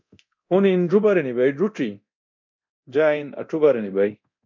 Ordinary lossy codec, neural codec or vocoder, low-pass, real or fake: MP3, 64 kbps; codec, 24 kHz, 0.9 kbps, DualCodec; 7.2 kHz; fake